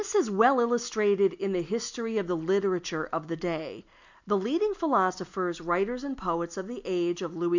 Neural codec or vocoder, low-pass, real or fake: none; 7.2 kHz; real